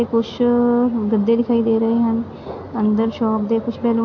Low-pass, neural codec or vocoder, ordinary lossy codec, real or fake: 7.2 kHz; none; AAC, 48 kbps; real